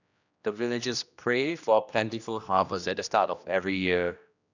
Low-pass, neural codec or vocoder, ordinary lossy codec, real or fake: 7.2 kHz; codec, 16 kHz, 1 kbps, X-Codec, HuBERT features, trained on general audio; none; fake